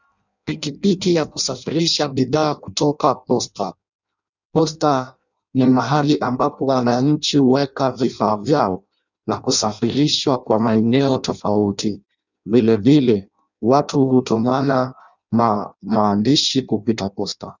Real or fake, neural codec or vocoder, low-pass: fake; codec, 16 kHz in and 24 kHz out, 0.6 kbps, FireRedTTS-2 codec; 7.2 kHz